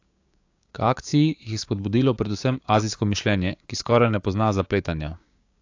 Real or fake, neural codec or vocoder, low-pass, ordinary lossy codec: fake; codec, 16 kHz, 6 kbps, DAC; 7.2 kHz; AAC, 48 kbps